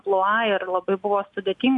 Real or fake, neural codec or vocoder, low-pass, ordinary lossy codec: real; none; 10.8 kHz; MP3, 64 kbps